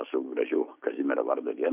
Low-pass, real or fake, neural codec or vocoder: 3.6 kHz; real; none